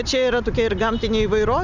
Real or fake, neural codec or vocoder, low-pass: real; none; 7.2 kHz